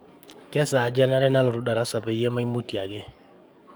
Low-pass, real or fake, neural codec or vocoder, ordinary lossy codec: none; fake; codec, 44.1 kHz, 7.8 kbps, DAC; none